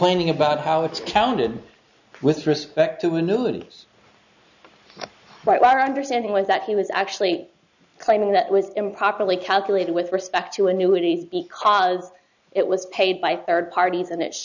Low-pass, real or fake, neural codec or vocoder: 7.2 kHz; real; none